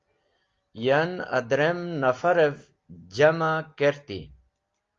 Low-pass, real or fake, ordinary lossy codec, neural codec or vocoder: 7.2 kHz; real; Opus, 32 kbps; none